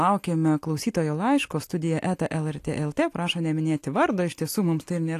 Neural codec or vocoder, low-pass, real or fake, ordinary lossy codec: none; 14.4 kHz; real; AAC, 64 kbps